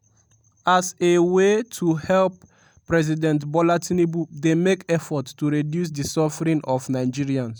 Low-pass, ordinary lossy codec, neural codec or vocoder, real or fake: none; none; none; real